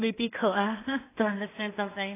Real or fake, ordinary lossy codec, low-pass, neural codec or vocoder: fake; AAC, 24 kbps; 3.6 kHz; codec, 16 kHz in and 24 kHz out, 0.4 kbps, LongCat-Audio-Codec, two codebook decoder